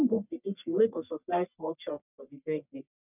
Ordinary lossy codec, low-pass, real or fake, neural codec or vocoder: none; 3.6 kHz; fake; codec, 44.1 kHz, 1.7 kbps, Pupu-Codec